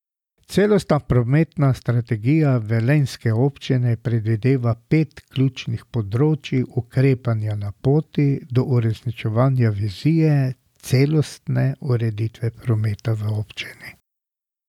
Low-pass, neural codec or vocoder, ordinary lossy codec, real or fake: 19.8 kHz; none; none; real